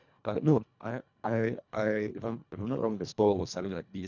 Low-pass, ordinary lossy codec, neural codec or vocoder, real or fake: 7.2 kHz; none; codec, 24 kHz, 1.5 kbps, HILCodec; fake